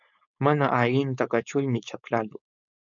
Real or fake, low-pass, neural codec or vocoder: fake; 7.2 kHz; codec, 16 kHz, 4.8 kbps, FACodec